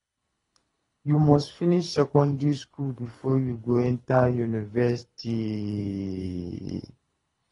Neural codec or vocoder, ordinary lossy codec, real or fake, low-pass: codec, 24 kHz, 3 kbps, HILCodec; AAC, 32 kbps; fake; 10.8 kHz